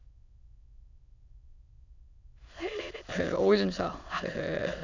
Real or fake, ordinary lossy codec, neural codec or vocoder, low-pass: fake; none; autoencoder, 22.05 kHz, a latent of 192 numbers a frame, VITS, trained on many speakers; 7.2 kHz